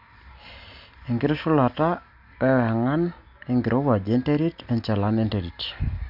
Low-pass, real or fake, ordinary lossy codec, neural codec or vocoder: 5.4 kHz; real; none; none